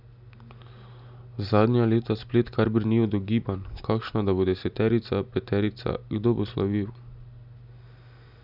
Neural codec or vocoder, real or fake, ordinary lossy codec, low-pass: none; real; none; 5.4 kHz